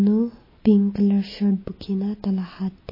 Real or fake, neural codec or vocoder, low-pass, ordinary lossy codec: real; none; 5.4 kHz; MP3, 24 kbps